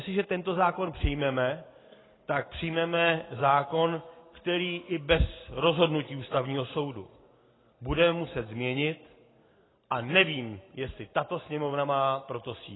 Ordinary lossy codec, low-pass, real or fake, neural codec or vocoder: AAC, 16 kbps; 7.2 kHz; real; none